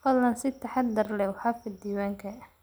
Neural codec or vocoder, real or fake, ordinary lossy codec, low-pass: none; real; none; none